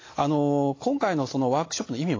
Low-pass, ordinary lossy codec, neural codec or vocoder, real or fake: 7.2 kHz; AAC, 32 kbps; none; real